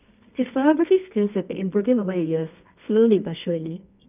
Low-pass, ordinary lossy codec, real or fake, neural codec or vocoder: 3.6 kHz; none; fake; codec, 24 kHz, 0.9 kbps, WavTokenizer, medium music audio release